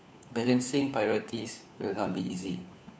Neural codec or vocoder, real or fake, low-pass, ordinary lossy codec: codec, 16 kHz, 4 kbps, FunCodec, trained on LibriTTS, 50 frames a second; fake; none; none